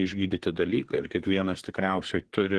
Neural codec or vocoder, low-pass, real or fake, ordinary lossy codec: codec, 24 kHz, 1 kbps, SNAC; 10.8 kHz; fake; Opus, 16 kbps